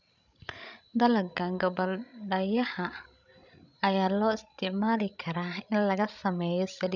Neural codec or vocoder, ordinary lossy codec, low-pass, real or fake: codec, 16 kHz, 16 kbps, FreqCodec, larger model; none; 7.2 kHz; fake